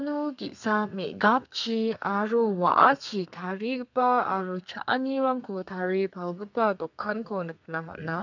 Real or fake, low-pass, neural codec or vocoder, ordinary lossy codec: fake; 7.2 kHz; codec, 32 kHz, 1.9 kbps, SNAC; none